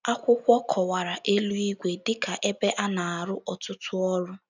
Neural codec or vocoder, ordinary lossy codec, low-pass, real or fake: none; none; 7.2 kHz; real